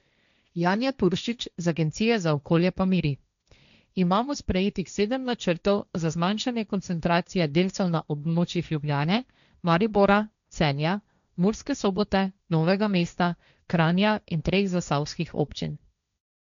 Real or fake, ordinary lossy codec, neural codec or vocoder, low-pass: fake; none; codec, 16 kHz, 1.1 kbps, Voila-Tokenizer; 7.2 kHz